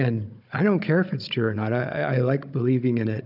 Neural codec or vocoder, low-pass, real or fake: codec, 16 kHz, 16 kbps, FunCodec, trained on Chinese and English, 50 frames a second; 5.4 kHz; fake